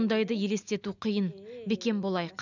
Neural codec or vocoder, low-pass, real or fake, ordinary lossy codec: none; 7.2 kHz; real; none